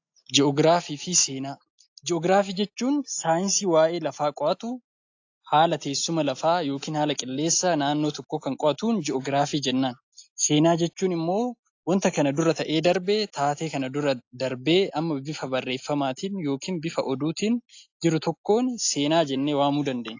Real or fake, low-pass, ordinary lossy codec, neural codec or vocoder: real; 7.2 kHz; AAC, 48 kbps; none